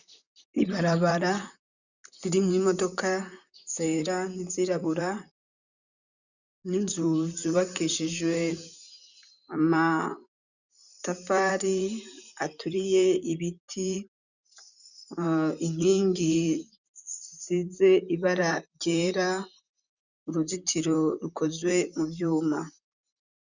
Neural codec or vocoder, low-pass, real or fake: vocoder, 44.1 kHz, 128 mel bands, Pupu-Vocoder; 7.2 kHz; fake